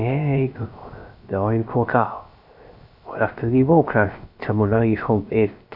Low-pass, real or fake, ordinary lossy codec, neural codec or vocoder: 5.4 kHz; fake; none; codec, 16 kHz, 0.3 kbps, FocalCodec